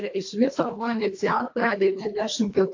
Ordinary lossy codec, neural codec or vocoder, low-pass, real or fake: AAC, 48 kbps; codec, 24 kHz, 1.5 kbps, HILCodec; 7.2 kHz; fake